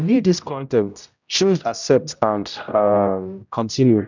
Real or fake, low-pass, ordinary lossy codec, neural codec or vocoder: fake; 7.2 kHz; none; codec, 16 kHz, 0.5 kbps, X-Codec, HuBERT features, trained on general audio